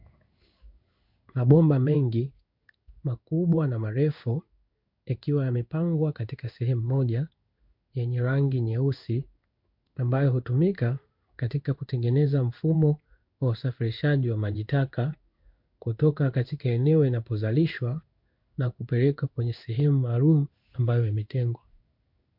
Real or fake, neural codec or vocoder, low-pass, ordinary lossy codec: fake; codec, 16 kHz in and 24 kHz out, 1 kbps, XY-Tokenizer; 5.4 kHz; MP3, 48 kbps